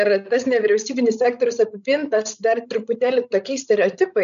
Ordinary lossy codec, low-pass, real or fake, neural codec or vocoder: MP3, 96 kbps; 7.2 kHz; fake; codec, 16 kHz, 16 kbps, FreqCodec, larger model